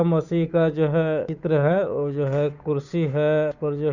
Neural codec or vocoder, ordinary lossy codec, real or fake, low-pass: codec, 16 kHz, 8 kbps, FunCodec, trained on Chinese and English, 25 frames a second; none; fake; 7.2 kHz